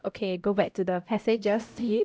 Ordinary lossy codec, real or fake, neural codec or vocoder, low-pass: none; fake; codec, 16 kHz, 0.5 kbps, X-Codec, HuBERT features, trained on LibriSpeech; none